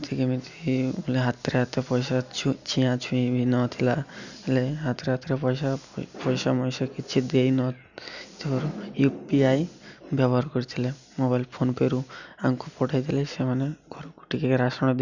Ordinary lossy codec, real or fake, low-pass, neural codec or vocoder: none; real; 7.2 kHz; none